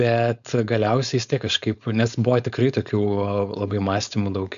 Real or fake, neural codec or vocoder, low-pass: fake; codec, 16 kHz, 4.8 kbps, FACodec; 7.2 kHz